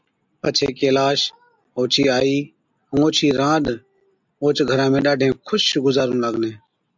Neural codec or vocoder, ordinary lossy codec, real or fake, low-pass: none; MP3, 64 kbps; real; 7.2 kHz